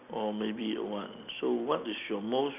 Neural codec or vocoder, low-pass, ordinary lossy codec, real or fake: none; 3.6 kHz; none; real